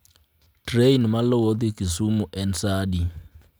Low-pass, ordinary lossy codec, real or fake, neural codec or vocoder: none; none; real; none